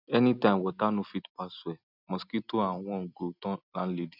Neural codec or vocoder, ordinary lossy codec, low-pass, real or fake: none; none; 5.4 kHz; real